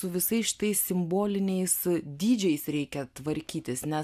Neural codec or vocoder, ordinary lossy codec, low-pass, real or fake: none; AAC, 96 kbps; 14.4 kHz; real